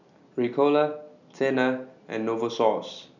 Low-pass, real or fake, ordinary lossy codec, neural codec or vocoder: 7.2 kHz; real; none; none